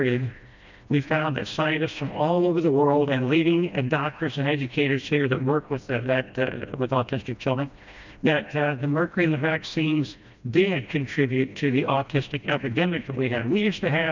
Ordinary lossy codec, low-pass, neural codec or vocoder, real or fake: MP3, 64 kbps; 7.2 kHz; codec, 16 kHz, 1 kbps, FreqCodec, smaller model; fake